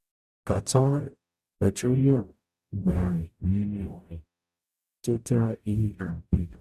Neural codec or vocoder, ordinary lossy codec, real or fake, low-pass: codec, 44.1 kHz, 0.9 kbps, DAC; Opus, 64 kbps; fake; 14.4 kHz